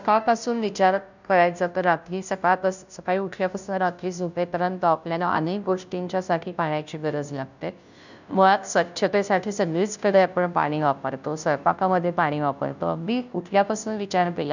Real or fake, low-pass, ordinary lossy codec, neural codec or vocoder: fake; 7.2 kHz; none; codec, 16 kHz, 0.5 kbps, FunCodec, trained on Chinese and English, 25 frames a second